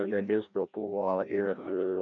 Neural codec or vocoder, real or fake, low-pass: codec, 16 kHz, 1 kbps, FreqCodec, larger model; fake; 7.2 kHz